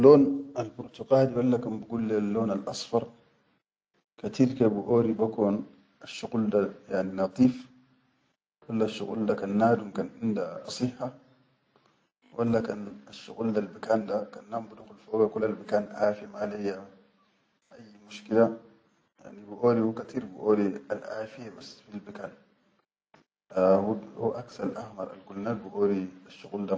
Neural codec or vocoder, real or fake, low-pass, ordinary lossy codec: none; real; none; none